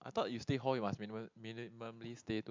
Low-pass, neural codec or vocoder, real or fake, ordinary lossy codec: 7.2 kHz; none; real; MP3, 64 kbps